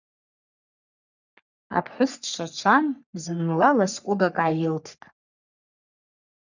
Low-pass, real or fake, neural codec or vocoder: 7.2 kHz; fake; codec, 44.1 kHz, 3.4 kbps, Pupu-Codec